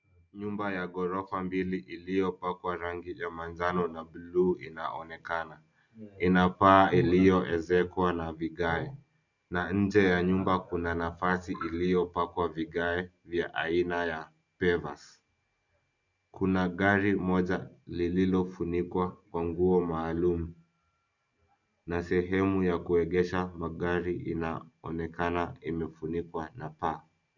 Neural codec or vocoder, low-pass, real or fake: none; 7.2 kHz; real